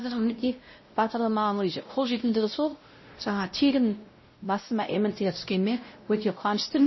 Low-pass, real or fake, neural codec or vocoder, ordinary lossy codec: 7.2 kHz; fake; codec, 16 kHz, 0.5 kbps, X-Codec, WavLM features, trained on Multilingual LibriSpeech; MP3, 24 kbps